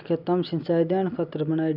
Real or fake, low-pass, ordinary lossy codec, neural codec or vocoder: real; 5.4 kHz; none; none